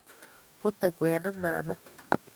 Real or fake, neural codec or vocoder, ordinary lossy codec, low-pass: fake; codec, 44.1 kHz, 2.6 kbps, DAC; none; none